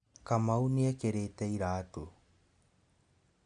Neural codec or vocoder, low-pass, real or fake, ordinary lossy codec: none; 9.9 kHz; real; none